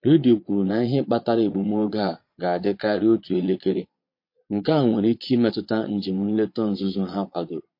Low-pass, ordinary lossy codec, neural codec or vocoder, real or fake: 5.4 kHz; MP3, 32 kbps; vocoder, 22.05 kHz, 80 mel bands, Vocos; fake